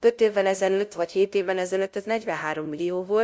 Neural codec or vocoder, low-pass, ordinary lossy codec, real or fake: codec, 16 kHz, 0.5 kbps, FunCodec, trained on LibriTTS, 25 frames a second; none; none; fake